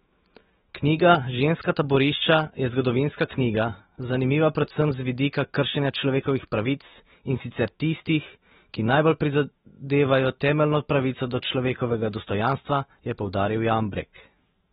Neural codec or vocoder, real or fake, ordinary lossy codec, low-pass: autoencoder, 48 kHz, 128 numbers a frame, DAC-VAE, trained on Japanese speech; fake; AAC, 16 kbps; 19.8 kHz